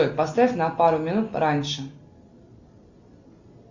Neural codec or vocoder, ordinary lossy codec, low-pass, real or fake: none; Opus, 64 kbps; 7.2 kHz; real